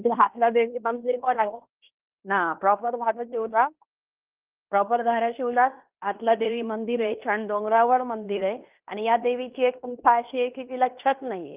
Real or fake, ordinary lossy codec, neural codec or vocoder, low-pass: fake; Opus, 24 kbps; codec, 16 kHz in and 24 kHz out, 0.9 kbps, LongCat-Audio-Codec, fine tuned four codebook decoder; 3.6 kHz